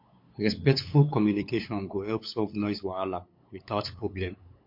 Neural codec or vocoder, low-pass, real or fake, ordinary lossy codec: codec, 16 kHz, 8 kbps, FunCodec, trained on LibriTTS, 25 frames a second; 5.4 kHz; fake; MP3, 32 kbps